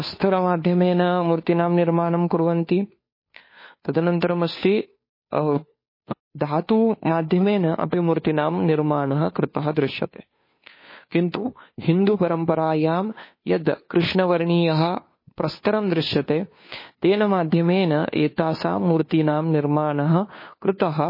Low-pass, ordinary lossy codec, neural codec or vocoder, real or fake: 5.4 kHz; MP3, 24 kbps; codec, 16 kHz, 2 kbps, FunCodec, trained on Chinese and English, 25 frames a second; fake